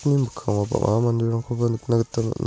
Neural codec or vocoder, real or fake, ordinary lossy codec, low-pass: none; real; none; none